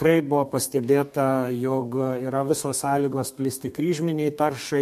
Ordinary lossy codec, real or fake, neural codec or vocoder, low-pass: MP3, 64 kbps; fake; codec, 32 kHz, 1.9 kbps, SNAC; 14.4 kHz